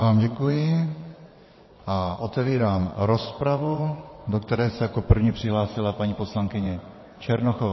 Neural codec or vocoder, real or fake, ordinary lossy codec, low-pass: vocoder, 22.05 kHz, 80 mel bands, WaveNeXt; fake; MP3, 24 kbps; 7.2 kHz